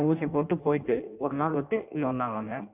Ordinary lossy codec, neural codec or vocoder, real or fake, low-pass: none; codec, 16 kHz in and 24 kHz out, 0.6 kbps, FireRedTTS-2 codec; fake; 3.6 kHz